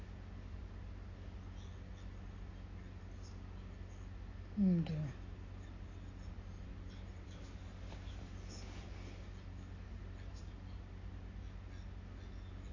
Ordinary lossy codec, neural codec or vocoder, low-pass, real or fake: AAC, 32 kbps; none; 7.2 kHz; real